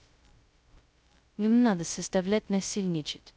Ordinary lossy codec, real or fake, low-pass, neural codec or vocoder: none; fake; none; codec, 16 kHz, 0.2 kbps, FocalCodec